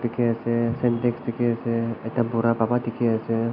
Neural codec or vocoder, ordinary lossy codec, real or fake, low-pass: none; none; real; 5.4 kHz